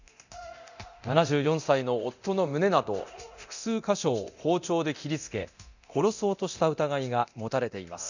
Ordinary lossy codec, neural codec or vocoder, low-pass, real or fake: none; codec, 24 kHz, 0.9 kbps, DualCodec; 7.2 kHz; fake